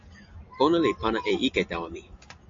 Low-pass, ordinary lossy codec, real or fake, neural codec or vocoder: 7.2 kHz; AAC, 48 kbps; real; none